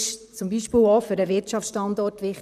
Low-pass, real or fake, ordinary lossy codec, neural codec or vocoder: 14.4 kHz; fake; none; vocoder, 44.1 kHz, 128 mel bands, Pupu-Vocoder